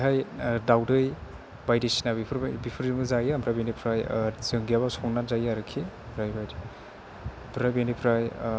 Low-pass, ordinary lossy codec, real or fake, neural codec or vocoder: none; none; real; none